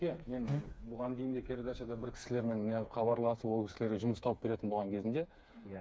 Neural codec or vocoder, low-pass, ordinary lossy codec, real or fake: codec, 16 kHz, 4 kbps, FreqCodec, smaller model; none; none; fake